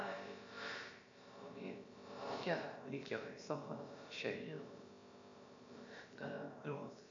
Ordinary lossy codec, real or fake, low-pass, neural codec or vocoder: MP3, 64 kbps; fake; 7.2 kHz; codec, 16 kHz, about 1 kbps, DyCAST, with the encoder's durations